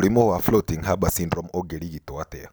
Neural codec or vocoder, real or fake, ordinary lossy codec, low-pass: none; real; none; none